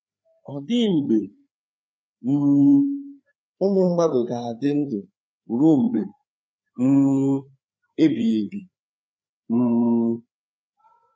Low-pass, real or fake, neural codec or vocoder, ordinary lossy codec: none; fake; codec, 16 kHz, 4 kbps, FreqCodec, larger model; none